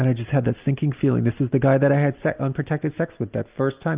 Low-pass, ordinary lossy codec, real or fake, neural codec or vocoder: 3.6 kHz; Opus, 32 kbps; real; none